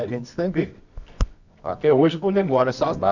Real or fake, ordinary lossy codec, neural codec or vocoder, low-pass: fake; none; codec, 24 kHz, 0.9 kbps, WavTokenizer, medium music audio release; 7.2 kHz